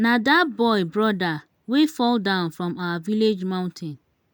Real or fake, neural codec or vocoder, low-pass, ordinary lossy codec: real; none; none; none